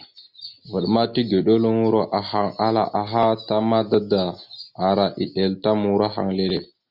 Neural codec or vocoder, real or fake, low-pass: none; real; 5.4 kHz